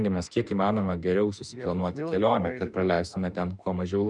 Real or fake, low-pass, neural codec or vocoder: fake; 10.8 kHz; autoencoder, 48 kHz, 32 numbers a frame, DAC-VAE, trained on Japanese speech